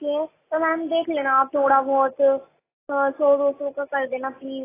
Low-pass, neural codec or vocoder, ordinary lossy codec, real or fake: 3.6 kHz; none; AAC, 16 kbps; real